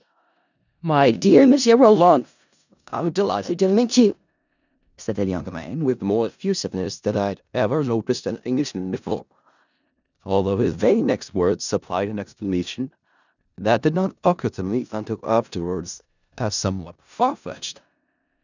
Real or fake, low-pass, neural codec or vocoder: fake; 7.2 kHz; codec, 16 kHz in and 24 kHz out, 0.4 kbps, LongCat-Audio-Codec, four codebook decoder